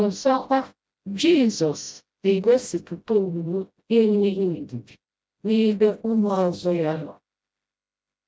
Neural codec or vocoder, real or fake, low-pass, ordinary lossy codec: codec, 16 kHz, 0.5 kbps, FreqCodec, smaller model; fake; none; none